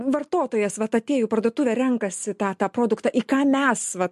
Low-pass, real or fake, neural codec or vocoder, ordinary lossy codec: 14.4 kHz; real; none; MP3, 64 kbps